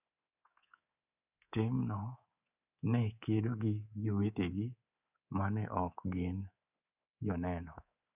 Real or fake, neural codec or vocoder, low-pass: fake; codec, 24 kHz, 3.1 kbps, DualCodec; 3.6 kHz